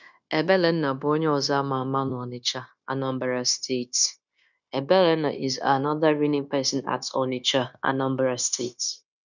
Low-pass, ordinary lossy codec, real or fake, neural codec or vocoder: 7.2 kHz; none; fake; codec, 16 kHz, 0.9 kbps, LongCat-Audio-Codec